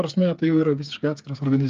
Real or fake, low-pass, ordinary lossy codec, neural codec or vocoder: fake; 7.2 kHz; Opus, 24 kbps; codec, 16 kHz, 8 kbps, FreqCodec, smaller model